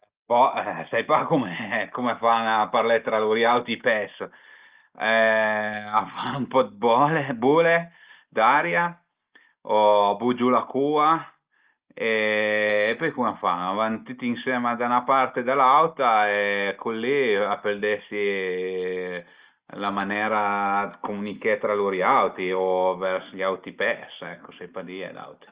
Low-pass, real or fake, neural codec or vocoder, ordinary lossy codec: 3.6 kHz; real; none; Opus, 24 kbps